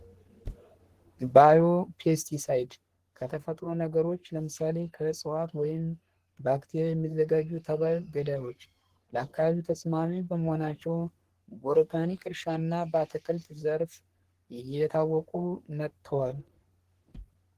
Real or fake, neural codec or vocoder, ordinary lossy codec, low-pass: fake; codec, 44.1 kHz, 3.4 kbps, Pupu-Codec; Opus, 16 kbps; 14.4 kHz